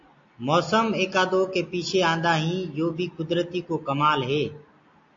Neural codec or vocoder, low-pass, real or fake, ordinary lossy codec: none; 7.2 kHz; real; AAC, 48 kbps